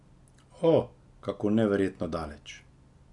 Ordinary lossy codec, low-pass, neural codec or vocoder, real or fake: none; 10.8 kHz; none; real